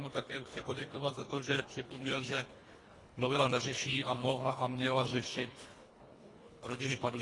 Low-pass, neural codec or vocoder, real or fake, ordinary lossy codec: 10.8 kHz; codec, 24 kHz, 1.5 kbps, HILCodec; fake; AAC, 32 kbps